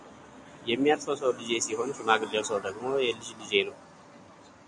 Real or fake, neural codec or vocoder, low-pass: real; none; 10.8 kHz